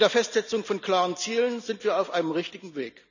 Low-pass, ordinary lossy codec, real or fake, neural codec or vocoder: 7.2 kHz; none; real; none